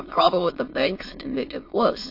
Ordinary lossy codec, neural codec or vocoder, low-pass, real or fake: MP3, 32 kbps; autoencoder, 22.05 kHz, a latent of 192 numbers a frame, VITS, trained on many speakers; 5.4 kHz; fake